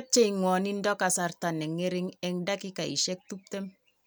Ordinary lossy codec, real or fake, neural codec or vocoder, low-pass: none; real; none; none